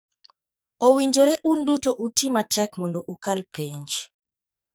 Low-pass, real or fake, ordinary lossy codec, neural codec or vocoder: none; fake; none; codec, 44.1 kHz, 2.6 kbps, SNAC